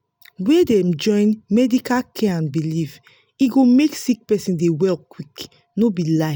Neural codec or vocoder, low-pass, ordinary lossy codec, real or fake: none; none; none; real